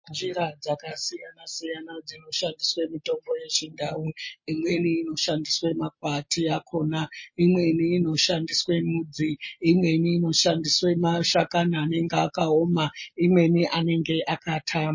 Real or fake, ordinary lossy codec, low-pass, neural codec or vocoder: real; MP3, 32 kbps; 7.2 kHz; none